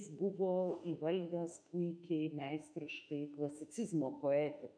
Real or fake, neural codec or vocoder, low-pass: fake; autoencoder, 48 kHz, 32 numbers a frame, DAC-VAE, trained on Japanese speech; 9.9 kHz